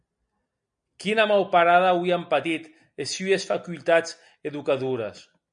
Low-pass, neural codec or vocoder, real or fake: 9.9 kHz; none; real